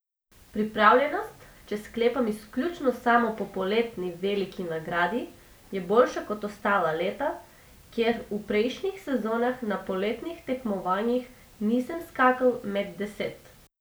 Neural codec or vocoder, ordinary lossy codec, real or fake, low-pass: none; none; real; none